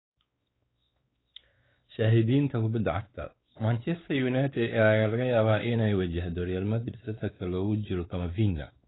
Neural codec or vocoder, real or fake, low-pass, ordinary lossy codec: codec, 16 kHz, 4 kbps, X-Codec, WavLM features, trained on Multilingual LibriSpeech; fake; 7.2 kHz; AAC, 16 kbps